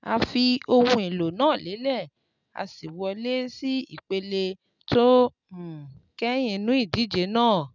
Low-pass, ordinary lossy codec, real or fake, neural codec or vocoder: 7.2 kHz; none; real; none